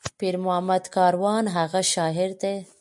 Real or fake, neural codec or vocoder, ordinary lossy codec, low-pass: real; none; MP3, 96 kbps; 10.8 kHz